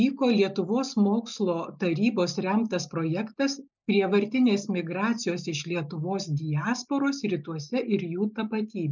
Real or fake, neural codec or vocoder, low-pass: real; none; 7.2 kHz